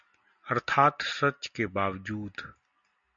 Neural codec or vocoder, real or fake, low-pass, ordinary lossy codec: none; real; 7.2 kHz; MP3, 48 kbps